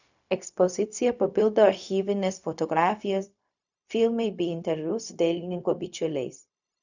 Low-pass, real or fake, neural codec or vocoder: 7.2 kHz; fake; codec, 16 kHz, 0.4 kbps, LongCat-Audio-Codec